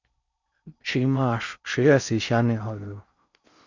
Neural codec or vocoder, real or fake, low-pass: codec, 16 kHz in and 24 kHz out, 0.6 kbps, FocalCodec, streaming, 4096 codes; fake; 7.2 kHz